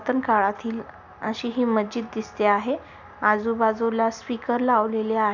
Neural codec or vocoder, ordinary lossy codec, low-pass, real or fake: none; none; 7.2 kHz; real